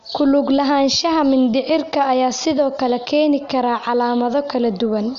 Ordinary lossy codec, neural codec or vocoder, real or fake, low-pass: none; none; real; 7.2 kHz